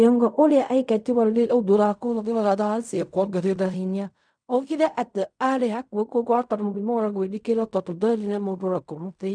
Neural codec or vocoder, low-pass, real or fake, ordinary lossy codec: codec, 16 kHz in and 24 kHz out, 0.4 kbps, LongCat-Audio-Codec, fine tuned four codebook decoder; 9.9 kHz; fake; none